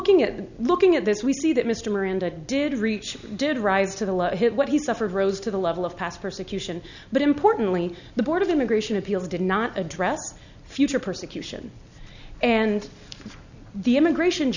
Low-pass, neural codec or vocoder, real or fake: 7.2 kHz; none; real